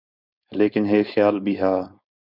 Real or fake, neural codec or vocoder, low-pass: fake; codec, 16 kHz, 4.8 kbps, FACodec; 5.4 kHz